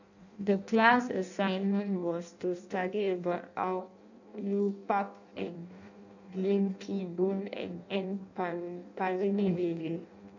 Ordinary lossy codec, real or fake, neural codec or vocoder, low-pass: none; fake; codec, 16 kHz in and 24 kHz out, 0.6 kbps, FireRedTTS-2 codec; 7.2 kHz